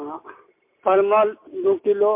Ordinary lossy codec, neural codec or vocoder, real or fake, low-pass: MP3, 24 kbps; none; real; 3.6 kHz